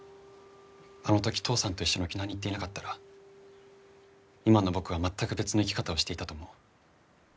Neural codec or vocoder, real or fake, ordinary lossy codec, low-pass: none; real; none; none